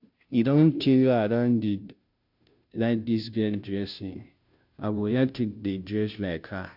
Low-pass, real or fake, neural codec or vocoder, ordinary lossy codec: 5.4 kHz; fake; codec, 16 kHz, 0.5 kbps, FunCodec, trained on Chinese and English, 25 frames a second; none